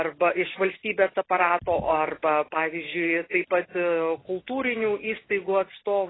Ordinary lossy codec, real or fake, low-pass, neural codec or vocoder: AAC, 16 kbps; real; 7.2 kHz; none